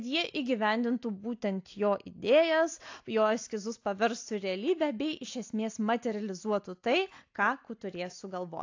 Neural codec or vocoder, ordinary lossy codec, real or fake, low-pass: none; AAC, 48 kbps; real; 7.2 kHz